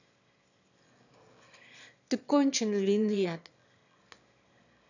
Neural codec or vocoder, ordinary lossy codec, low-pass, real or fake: autoencoder, 22.05 kHz, a latent of 192 numbers a frame, VITS, trained on one speaker; none; 7.2 kHz; fake